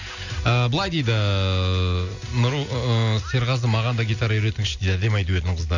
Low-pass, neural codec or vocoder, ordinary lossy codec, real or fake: 7.2 kHz; none; none; real